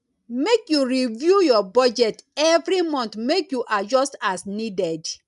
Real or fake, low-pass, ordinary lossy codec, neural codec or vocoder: real; 10.8 kHz; none; none